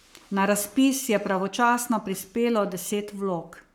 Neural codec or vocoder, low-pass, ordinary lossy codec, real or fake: codec, 44.1 kHz, 7.8 kbps, Pupu-Codec; none; none; fake